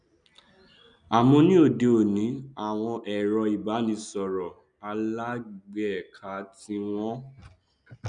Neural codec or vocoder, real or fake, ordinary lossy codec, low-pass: none; real; MP3, 96 kbps; 9.9 kHz